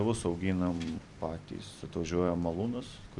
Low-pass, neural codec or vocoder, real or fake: 10.8 kHz; vocoder, 44.1 kHz, 128 mel bands every 256 samples, BigVGAN v2; fake